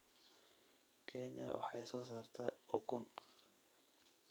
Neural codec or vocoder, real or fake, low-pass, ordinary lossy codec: codec, 44.1 kHz, 2.6 kbps, SNAC; fake; none; none